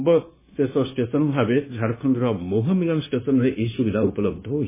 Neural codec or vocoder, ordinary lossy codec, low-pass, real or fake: codec, 16 kHz, 0.9 kbps, LongCat-Audio-Codec; MP3, 16 kbps; 3.6 kHz; fake